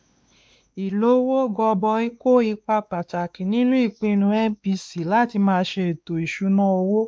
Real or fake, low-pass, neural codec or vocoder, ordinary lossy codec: fake; none; codec, 16 kHz, 2 kbps, X-Codec, WavLM features, trained on Multilingual LibriSpeech; none